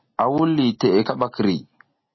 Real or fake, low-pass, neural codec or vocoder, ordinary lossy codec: real; 7.2 kHz; none; MP3, 24 kbps